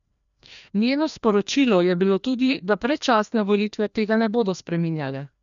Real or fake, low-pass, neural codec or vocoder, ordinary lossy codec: fake; 7.2 kHz; codec, 16 kHz, 1 kbps, FreqCodec, larger model; none